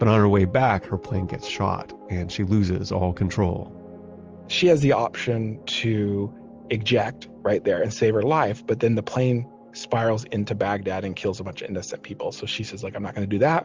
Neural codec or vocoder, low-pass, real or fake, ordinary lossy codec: none; 7.2 kHz; real; Opus, 24 kbps